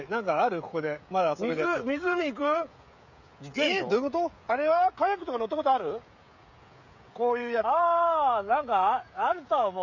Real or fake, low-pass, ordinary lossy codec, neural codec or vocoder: fake; 7.2 kHz; none; codec, 16 kHz, 16 kbps, FreqCodec, smaller model